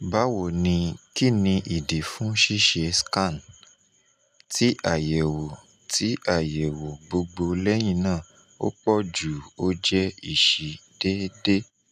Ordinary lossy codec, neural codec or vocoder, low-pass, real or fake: none; none; 14.4 kHz; real